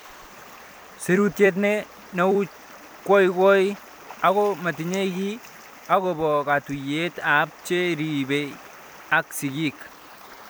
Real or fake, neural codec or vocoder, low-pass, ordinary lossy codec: fake; vocoder, 44.1 kHz, 128 mel bands every 256 samples, BigVGAN v2; none; none